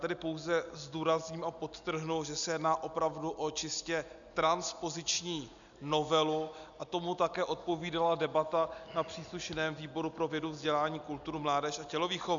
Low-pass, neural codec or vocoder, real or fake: 7.2 kHz; none; real